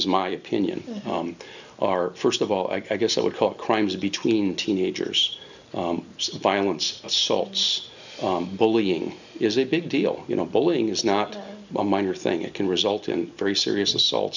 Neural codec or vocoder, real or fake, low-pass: none; real; 7.2 kHz